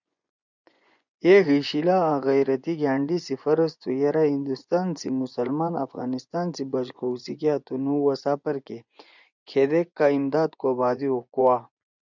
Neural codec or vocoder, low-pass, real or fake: vocoder, 24 kHz, 100 mel bands, Vocos; 7.2 kHz; fake